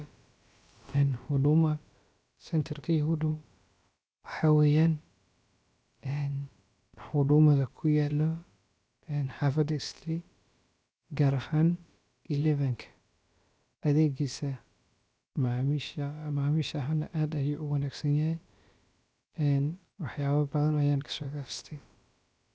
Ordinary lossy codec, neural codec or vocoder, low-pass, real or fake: none; codec, 16 kHz, about 1 kbps, DyCAST, with the encoder's durations; none; fake